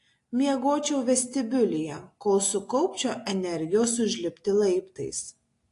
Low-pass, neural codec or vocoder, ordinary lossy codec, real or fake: 10.8 kHz; none; AAC, 48 kbps; real